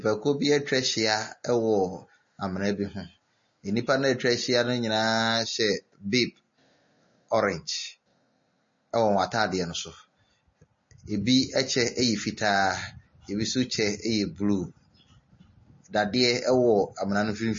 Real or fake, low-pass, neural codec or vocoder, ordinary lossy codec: real; 7.2 kHz; none; MP3, 32 kbps